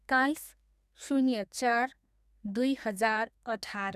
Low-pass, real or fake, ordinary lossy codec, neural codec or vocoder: 14.4 kHz; fake; none; codec, 32 kHz, 1.9 kbps, SNAC